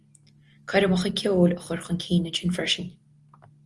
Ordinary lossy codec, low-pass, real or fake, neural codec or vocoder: Opus, 24 kbps; 10.8 kHz; real; none